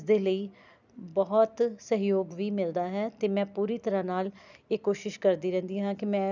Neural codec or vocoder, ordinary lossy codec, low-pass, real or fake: none; none; 7.2 kHz; real